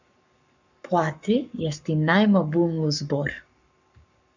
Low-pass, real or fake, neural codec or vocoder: 7.2 kHz; fake; codec, 44.1 kHz, 7.8 kbps, Pupu-Codec